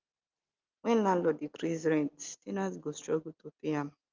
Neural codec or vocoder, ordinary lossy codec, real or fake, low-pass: none; Opus, 32 kbps; real; 7.2 kHz